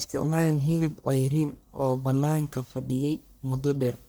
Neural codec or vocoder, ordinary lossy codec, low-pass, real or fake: codec, 44.1 kHz, 1.7 kbps, Pupu-Codec; none; none; fake